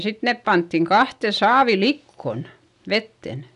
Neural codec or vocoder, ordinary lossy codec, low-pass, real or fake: none; none; 10.8 kHz; real